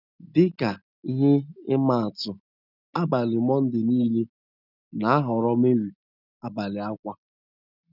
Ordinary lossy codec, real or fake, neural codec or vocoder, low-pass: none; real; none; 5.4 kHz